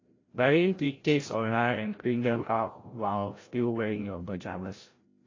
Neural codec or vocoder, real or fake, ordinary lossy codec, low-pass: codec, 16 kHz, 0.5 kbps, FreqCodec, larger model; fake; AAC, 32 kbps; 7.2 kHz